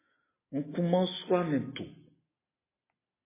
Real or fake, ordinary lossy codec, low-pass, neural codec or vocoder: real; MP3, 16 kbps; 3.6 kHz; none